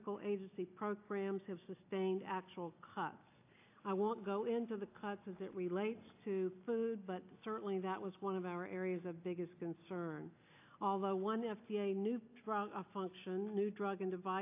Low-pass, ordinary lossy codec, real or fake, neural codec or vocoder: 3.6 kHz; AAC, 24 kbps; real; none